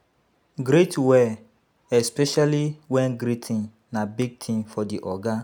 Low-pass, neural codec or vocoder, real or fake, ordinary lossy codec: none; none; real; none